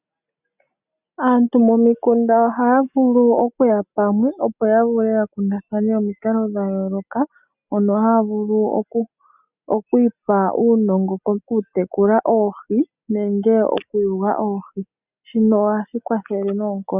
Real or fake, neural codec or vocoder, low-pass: real; none; 3.6 kHz